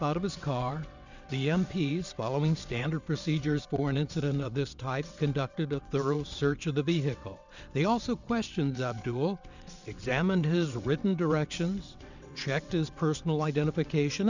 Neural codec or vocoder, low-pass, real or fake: vocoder, 22.05 kHz, 80 mel bands, WaveNeXt; 7.2 kHz; fake